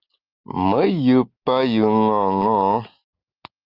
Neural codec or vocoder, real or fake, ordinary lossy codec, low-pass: none; real; Opus, 32 kbps; 5.4 kHz